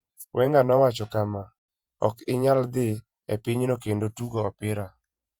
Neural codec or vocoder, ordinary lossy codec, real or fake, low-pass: vocoder, 48 kHz, 128 mel bands, Vocos; Opus, 64 kbps; fake; 19.8 kHz